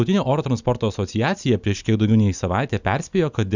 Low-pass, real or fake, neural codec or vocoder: 7.2 kHz; real; none